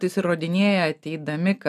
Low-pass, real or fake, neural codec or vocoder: 14.4 kHz; real; none